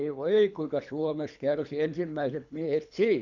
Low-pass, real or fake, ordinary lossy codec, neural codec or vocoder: 7.2 kHz; fake; none; codec, 24 kHz, 3 kbps, HILCodec